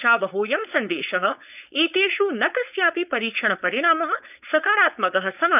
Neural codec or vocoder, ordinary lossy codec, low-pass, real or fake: codec, 16 kHz, 4.8 kbps, FACodec; none; 3.6 kHz; fake